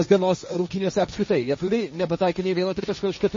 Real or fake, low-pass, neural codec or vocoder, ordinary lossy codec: fake; 7.2 kHz; codec, 16 kHz, 1.1 kbps, Voila-Tokenizer; MP3, 32 kbps